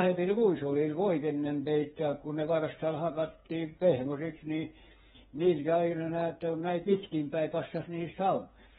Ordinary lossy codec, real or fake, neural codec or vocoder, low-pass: AAC, 16 kbps; fake; codec, 16 kHz, 4 kbps, FreqCodec, smaller model; 7.2 kHz